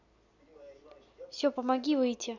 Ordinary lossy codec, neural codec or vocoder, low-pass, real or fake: none; none; 7.2 kHz; real